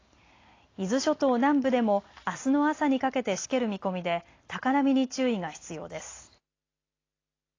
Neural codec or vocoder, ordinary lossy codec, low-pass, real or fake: none; AAC, 32 kbps; 7.2 kHz; real